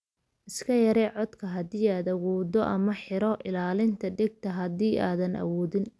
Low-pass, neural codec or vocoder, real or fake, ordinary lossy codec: none; none; real; none